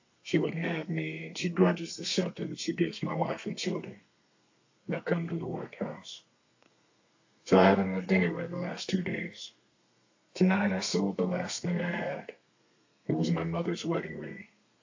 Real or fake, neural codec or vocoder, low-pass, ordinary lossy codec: fake; codec, 32 kHz, 1.9 kbps, SNAC; 7.2 kHz; AAC, 48 kbps